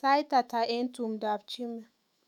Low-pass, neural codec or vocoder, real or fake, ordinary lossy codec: 19.8 kHz; autoencoder, 48 kHz, 128 numbers a frame, DAC-VAE, trained on Japanese speech; fake; none